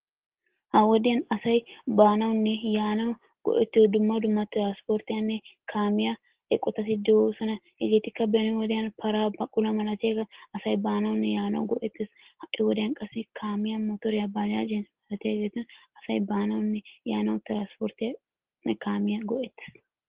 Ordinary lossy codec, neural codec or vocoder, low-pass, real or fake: Opus, 16 kbps; none; 3.6 kHz; real